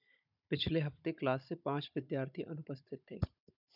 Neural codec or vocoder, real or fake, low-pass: codec, 16 kHz, 16 kbps, FunCodec, trained on Chinese and English, 50 frames a second; fake; 5.4 kHz